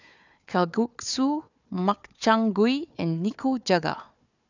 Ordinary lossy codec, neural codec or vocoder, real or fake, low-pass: none; codec, 16 kHz, 4 kbps, FunCodec, trained on Chinese and English, 50 frames a second; fake; 7.2 kHz